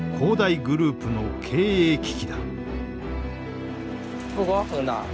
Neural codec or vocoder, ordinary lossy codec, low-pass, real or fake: none; none; none; real